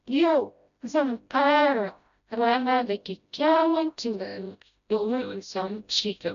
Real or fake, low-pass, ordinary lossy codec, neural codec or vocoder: fake; 7.2 kHz; none; codec, 16 kHz, 0.5 kbps, FreqCodec, smaller model